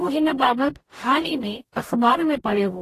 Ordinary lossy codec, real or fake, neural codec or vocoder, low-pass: AAC, 48 kbps; fake; codec, 44.1 kHz, 0.9 kbps, DAC; 14.4 kHz